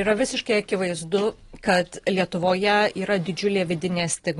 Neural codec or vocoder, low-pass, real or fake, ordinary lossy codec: none; 10.8 kHz; real; AAC, 32 kbps